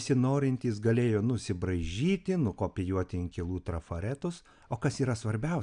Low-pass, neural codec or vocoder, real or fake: 9.9 kHz; none; real